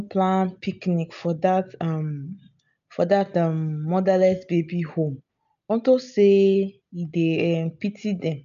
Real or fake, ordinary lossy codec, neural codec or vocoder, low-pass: real; none; none; 7.2 kHz